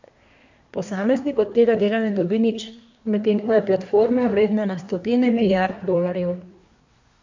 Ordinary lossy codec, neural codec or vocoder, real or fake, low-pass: MP3, 64 kbps; codec, 24 kHz, 1 kbps, SNAC; fake; 7.2 kHz